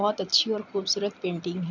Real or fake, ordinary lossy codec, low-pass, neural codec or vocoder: real; none; 7.2 kHz; none